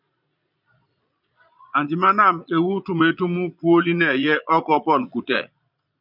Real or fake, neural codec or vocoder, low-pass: fake; vocoder, 22.05 kHz, 80 mel bands, Vocos; 5.4 kHz